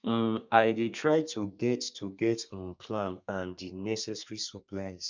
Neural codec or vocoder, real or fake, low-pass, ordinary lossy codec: codec, 32 kHz, 1.9 kbps, SNAC; fake; 7.2 kHz; MP3, 64 kbps